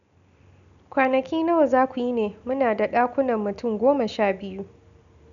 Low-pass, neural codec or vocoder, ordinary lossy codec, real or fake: 7.2 kHz; none; none; real